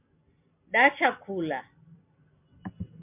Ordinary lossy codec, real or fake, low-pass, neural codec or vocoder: MP3, 32 kbps; real; 3.6 kHz; none